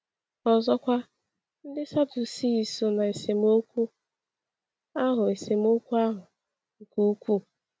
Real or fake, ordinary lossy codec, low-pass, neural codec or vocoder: real; none; none; none